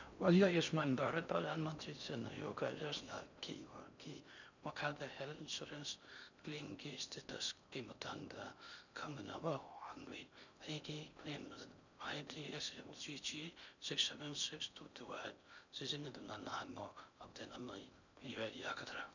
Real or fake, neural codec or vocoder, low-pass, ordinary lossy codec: fake; codec, 16 kHz in and 24 kHz out, 0.6 kbps, FocalCodec, streaming, 2048 codes; 7.2 kHz; none